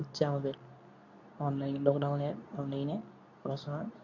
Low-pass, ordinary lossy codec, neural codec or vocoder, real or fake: 7.2 kHz; none; codec, 24 kHz, 0.9 kbps, WavTokenizer, medium speech release version 2; fake